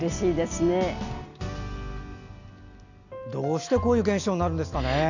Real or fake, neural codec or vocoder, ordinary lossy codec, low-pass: real; none; none; 7.2 kHz